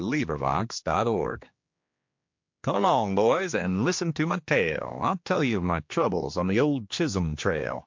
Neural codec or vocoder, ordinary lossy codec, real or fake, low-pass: codec, 16 kHz, 2 kbps, X-Codec, HuBERT features, trained on general audio; MP3, 48 kbps; fake; 7.2 kHz